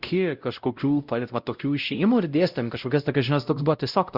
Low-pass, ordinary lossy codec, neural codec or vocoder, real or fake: 5.4 kHz; Opus, 64 kbps; codec, 16 kHz, 0.5 kbps, X-Codec, WavLM features, trained on Multilingual LibriSpeech; fake